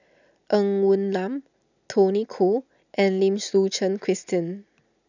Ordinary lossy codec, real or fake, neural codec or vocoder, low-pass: none; real; none; 7.2 kHz